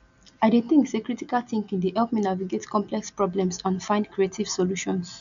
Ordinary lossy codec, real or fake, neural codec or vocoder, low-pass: MP3, 96 kbps; real; none; 7.2 kHz